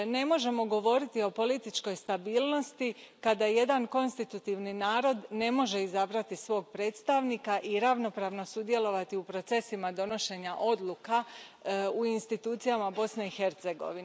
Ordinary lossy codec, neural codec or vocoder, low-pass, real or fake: none; none; none; real